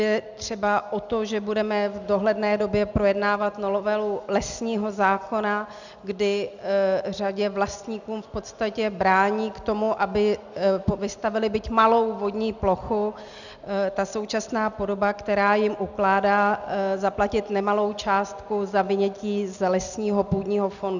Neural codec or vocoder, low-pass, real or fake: none; 7.2 kHz; real